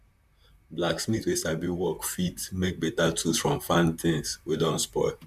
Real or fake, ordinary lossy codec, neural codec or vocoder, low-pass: fake; none; vocoder, 44.1 kHz, 128 mel bands, Pupu-Vocoder; 14.4 kHz